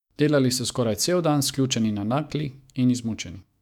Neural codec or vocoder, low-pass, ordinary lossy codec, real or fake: autoencoder, 48 kHz, 128 numbers a frame, DAC-VAE, trained on Japanese speech; 19.8 kHz; none; fake